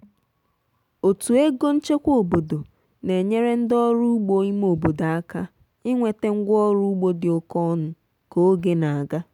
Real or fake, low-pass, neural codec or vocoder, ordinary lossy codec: real; 19.8 kHz; none; none